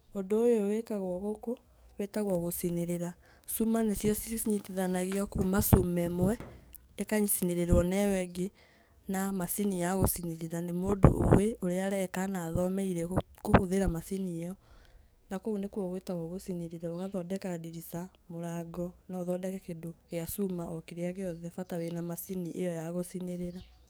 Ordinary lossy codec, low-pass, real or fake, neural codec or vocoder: none; none; fake; codec, 44.1 kHz, 7.8 kbps, DAC